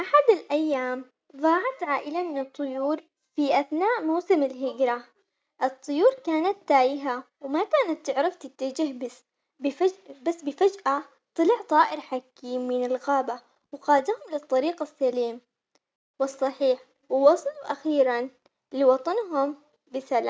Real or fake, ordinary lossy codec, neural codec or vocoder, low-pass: real; none; none; none